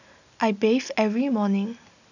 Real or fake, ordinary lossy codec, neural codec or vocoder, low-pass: real; none; none; 7.2 kHz